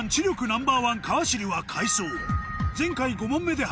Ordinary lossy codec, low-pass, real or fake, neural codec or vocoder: none; none; real; none